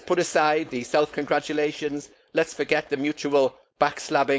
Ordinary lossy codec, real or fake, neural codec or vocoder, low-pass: none; fake; codec, 16 kHz, 4.8 kbps, FACodec; none